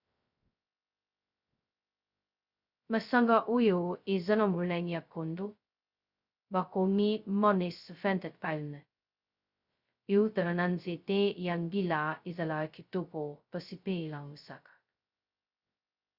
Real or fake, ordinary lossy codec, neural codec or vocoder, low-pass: fake; Opus, 64 kbps; codec, 16 kHz, 0.2 kbps, FocalCodec; 5.4 kHz